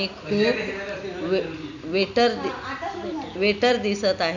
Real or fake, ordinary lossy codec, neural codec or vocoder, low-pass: real; none; none; 7.2 kHz